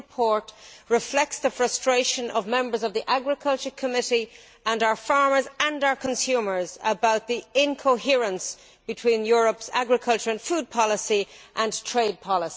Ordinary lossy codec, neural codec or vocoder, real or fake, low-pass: none; none; real; none